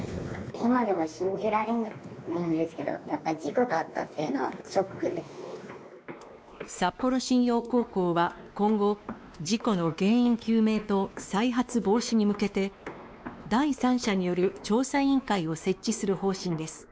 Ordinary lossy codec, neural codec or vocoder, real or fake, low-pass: none; codec, 16 kHz, 2 kbps, X-Codec, WavLM features, trained on Multilingual LibriSpeech; fake; none